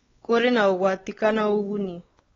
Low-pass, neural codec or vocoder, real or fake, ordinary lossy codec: 7.2 kHz; codec, 16 kHz, 4 kbps, X-Codec, WavLM features, trained on Multilingual LibriSpeech; fake; AAC, 24 kbps